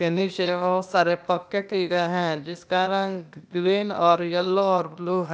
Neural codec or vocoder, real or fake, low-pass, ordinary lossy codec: codec, 16 kHz, 0.8 kbps, ZipCodec; fake; none; none